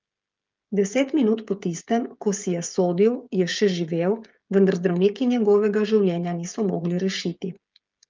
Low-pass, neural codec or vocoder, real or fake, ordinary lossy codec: 7.2 kHz; codec, 16 kHz, 16 kbps, FreqCodec, smaller model; fake; Opus, 32 kbps